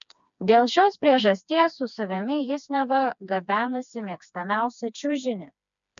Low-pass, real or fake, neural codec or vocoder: 7.2 kHz; fake; codec, 16 kHz, 2 kbps, FreqCodec, smaller model